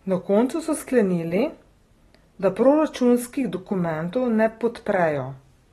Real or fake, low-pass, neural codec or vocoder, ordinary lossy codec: real; 19.8 kHz; none; AAC, 32 kbps